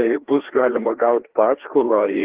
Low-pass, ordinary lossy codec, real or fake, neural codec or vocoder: 3.6 kHz; Opus, 16 kbps; fake; codec, 16 kHz, 2 kbps, FreqCodec, larger model